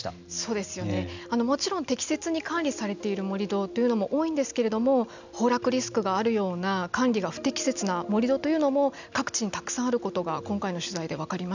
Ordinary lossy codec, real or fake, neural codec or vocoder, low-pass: none; real; none; 7.2 kHz